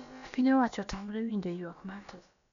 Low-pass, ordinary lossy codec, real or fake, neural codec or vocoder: 7.2 kHz; none; fake; codec, 16 kHz, about 1 kbps, DyCAST, with the encoder's durations